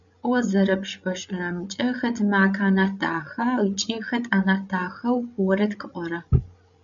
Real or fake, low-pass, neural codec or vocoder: fake; 7.2 kHz; codec, 16 kHz, 16 kbps, FreqCodec, larger model